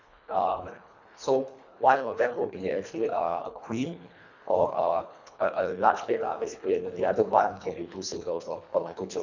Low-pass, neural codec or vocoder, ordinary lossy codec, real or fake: 7.2 kHz; codec, 24 kHz, 1.5 kbps, HILCodec; none; fake